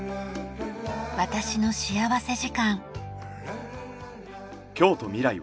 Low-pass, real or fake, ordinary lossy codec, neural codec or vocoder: none; real; none; none